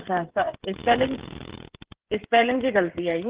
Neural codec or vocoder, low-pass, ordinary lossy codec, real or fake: codec, 16 kHz, 16 kbps, FreqCodec, smaller model; 3.6 kHz; Opus, 16 kbps; fake